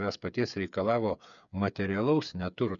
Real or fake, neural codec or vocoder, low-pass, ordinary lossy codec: fake; codec, 16 kHz, 8 kbps, FreqCodec, smaller model; 7.2 kHz; MP3, 96 kbps